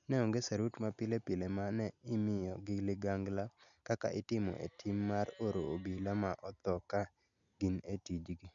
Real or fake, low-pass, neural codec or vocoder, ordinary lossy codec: real; 7.2 kHz; none; none